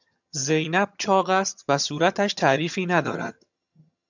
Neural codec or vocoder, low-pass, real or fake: vocoder, 22.05 kHz, 80 mel bands, HiFi-GAN; 7.2 kHz; fake